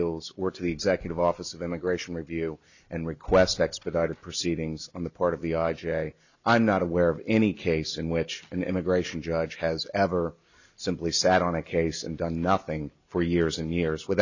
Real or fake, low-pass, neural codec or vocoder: real; 7.2 kHz; none